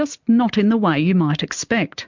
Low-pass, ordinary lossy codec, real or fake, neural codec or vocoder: 7.2 kHz; MP3, 64 kbps; real; none